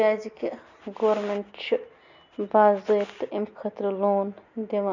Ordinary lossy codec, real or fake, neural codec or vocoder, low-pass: none; real; none; 7.2 kHz